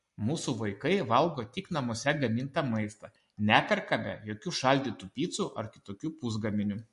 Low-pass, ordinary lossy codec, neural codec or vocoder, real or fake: 14.4 kHz; MP3, 48 kbps; none; real